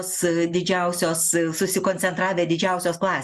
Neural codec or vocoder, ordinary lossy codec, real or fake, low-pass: none; MP3, 96 kbps; real; 14.4 kHz